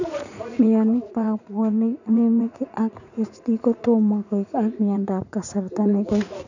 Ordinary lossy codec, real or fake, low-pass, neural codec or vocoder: none; fake; 7.2 kHz; vocoder, 44.1 kHz, 80 mel bands, Vocos